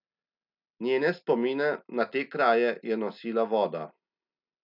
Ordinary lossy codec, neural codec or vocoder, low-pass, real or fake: none; none; 5.4 kHz; real